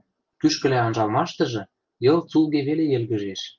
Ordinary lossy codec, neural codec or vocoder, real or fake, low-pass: Opus, 32 kbps; none; real; 7.2 kHz